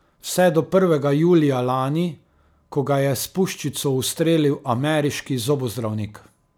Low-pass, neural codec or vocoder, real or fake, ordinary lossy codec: none; none; real; none